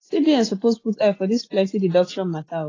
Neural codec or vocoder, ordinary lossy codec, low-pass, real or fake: none; AAC, 32 kbps; 7.2 kHz; real